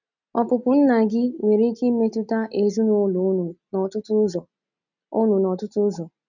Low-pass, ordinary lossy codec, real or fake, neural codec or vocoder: 7.2 kHz; none; real; none